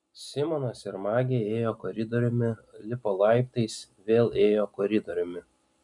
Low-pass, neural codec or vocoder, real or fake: 10.8 kHz; none; real